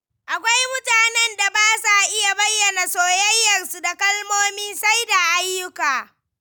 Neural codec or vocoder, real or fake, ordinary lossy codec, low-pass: none; real; none; none